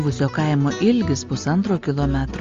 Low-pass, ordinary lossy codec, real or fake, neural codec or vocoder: 7.2 kHz; Opus, 32 kbps; real; none